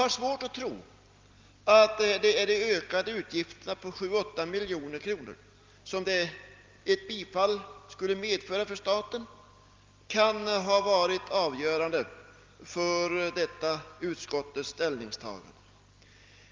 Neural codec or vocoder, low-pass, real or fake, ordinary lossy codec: none; 7.2 kHz; real; Opus, 32 kbps